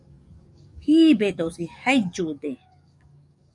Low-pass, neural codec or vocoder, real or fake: 10.8 kHz; codec, 44.1 kHz, 7.8 kbps, DAC; fake